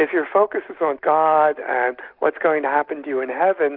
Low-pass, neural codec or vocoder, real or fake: 5.4 kHz; none; real